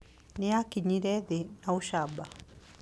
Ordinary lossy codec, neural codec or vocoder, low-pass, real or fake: none; none; none; real